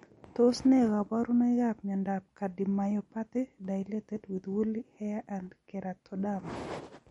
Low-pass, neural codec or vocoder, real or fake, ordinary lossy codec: 19.8 kHz; none; real; MP3, 48 kbps